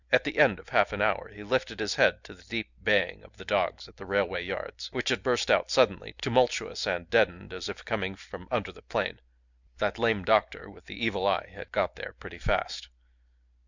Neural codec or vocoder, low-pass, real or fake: none; 7.2 kHz; real